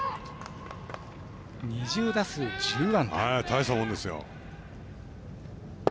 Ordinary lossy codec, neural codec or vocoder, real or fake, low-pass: none; none; real; none